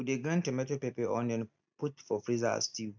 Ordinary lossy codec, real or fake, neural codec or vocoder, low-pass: MP3, 64 kbps; real; none; 7.2 kHz